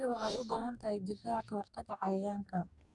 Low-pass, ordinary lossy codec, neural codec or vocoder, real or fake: 10.8 kHz; none; codec, 44.1 kHz, 2.6 kbps, DAC; fake